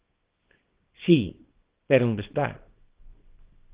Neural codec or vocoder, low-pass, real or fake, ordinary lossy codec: codec, 24 kHz, 0.9 kbps, WavTokenizer, small release; 3.6 kHz; fake; Opus, 16 kbps